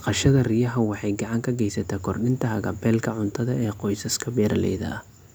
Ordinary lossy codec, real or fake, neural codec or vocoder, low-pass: none; real; none; none